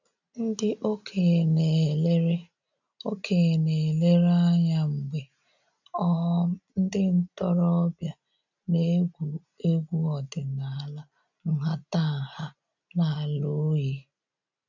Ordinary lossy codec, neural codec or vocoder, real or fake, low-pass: none; none; real; 7.2 kHz